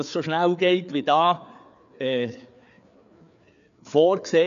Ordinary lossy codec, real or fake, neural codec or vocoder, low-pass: none; fake; codec, 16 kHz, 4 kbps, FreqCodec, larger model; 7.2 kHz